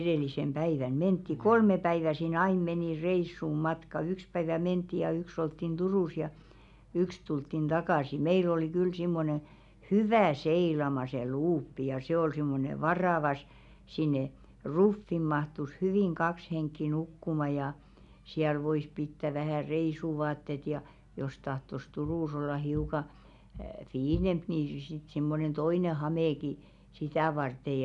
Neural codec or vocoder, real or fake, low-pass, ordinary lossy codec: none; real; 10.8 kHz; none